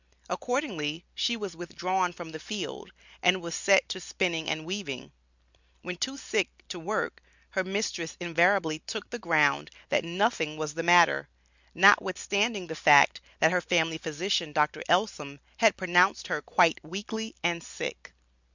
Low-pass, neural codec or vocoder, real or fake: 7.2 kHz; none; real